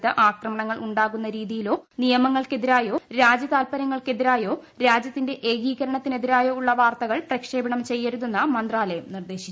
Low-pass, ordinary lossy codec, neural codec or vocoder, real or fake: none; none; none; real